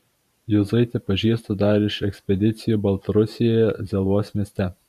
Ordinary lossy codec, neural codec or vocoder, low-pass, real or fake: MP3, 96 kbps; vocoder, 44.1 kHz, 128 mel bands every 512 samples, BigVGAN v2; 14.4 kHz; fake